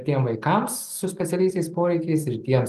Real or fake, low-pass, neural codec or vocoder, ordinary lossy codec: real; 14.4 kHz; none; Opus, 24 kbps